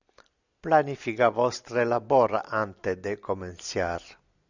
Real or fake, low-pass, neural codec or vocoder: real; 7.2 kHz; none